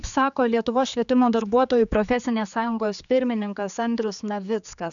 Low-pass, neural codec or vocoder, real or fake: 7.2 kHz; codec, 16 kHz, 4 kbps, X-Codec, HuBERT features, trained on general audio; fake